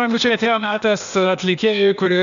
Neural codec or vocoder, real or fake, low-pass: codec, 16 kHz, 0.8 kbps, ZipCodec; fake; 7.2 kHz